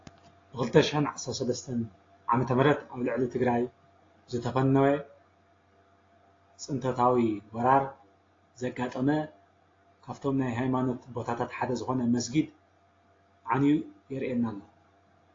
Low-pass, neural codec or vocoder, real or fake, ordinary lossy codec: 7.2 kHz; none; real; AAC, 32 kbps